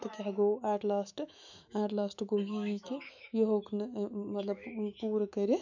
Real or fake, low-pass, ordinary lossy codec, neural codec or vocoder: fake; 7.2 kHz; none; autoencoder, 48 kHz, 128 numbers a frame, DAC-VAE, trained on Japanese speech